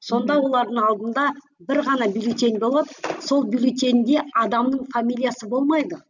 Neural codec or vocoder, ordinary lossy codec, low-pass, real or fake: none; none; 7.2 kHz; real